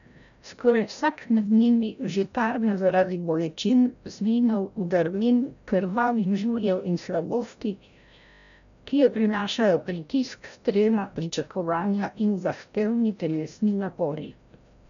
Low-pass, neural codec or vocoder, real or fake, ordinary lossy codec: 7.2 kHz; codec, 16 kHz, 0.5 kbps, FreqCodec, larger model; fake; none